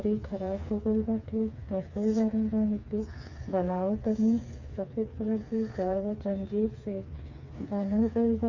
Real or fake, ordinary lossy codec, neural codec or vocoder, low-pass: fake; none; codec, 16 kHz, 4 kbps, FreqCodec, smaller model; 7.2 kHz